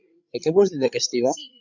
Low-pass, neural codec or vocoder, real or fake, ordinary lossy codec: 7.2 kHz; codec, 16 kHz, 16 kbps, FreqCodec, larger model; fake; MP3, 64 kbps